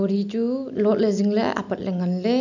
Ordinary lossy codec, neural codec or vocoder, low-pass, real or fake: none; none; 7.2 kHz; real